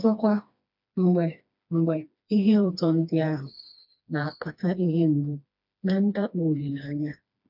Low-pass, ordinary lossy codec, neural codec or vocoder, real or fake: 5.4 kHz; none; codec, 16 kHz, 2 kbps, FreqCodec, smaller model; fake